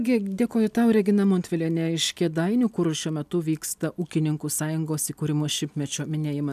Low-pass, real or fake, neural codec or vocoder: 14.4 kHz; real; none